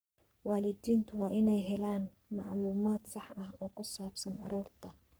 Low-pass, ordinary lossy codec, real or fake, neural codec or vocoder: none; none; fake; codec, 44.1 kHz, 3.4 kbps, Pupu-Codec